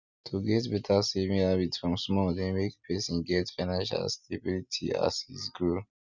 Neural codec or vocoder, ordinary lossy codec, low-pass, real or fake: none; none; 7.2 kHz; real